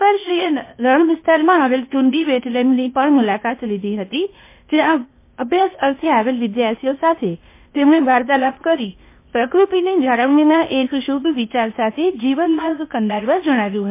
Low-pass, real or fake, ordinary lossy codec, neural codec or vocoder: 3.6 kHz; fake; MP3, 24 kbps; codec, 16 kHz, 0.8 kbps, ZipCodec